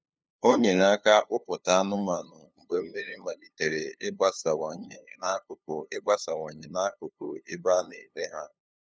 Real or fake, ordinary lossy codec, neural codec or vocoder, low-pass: fake; none; codec, 16 kHz, 2 kbps, FunCodec, trained on LibriTTS, 25 frames a second; none